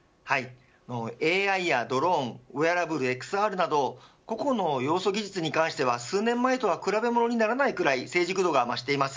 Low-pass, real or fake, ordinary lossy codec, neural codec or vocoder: none; real; none; none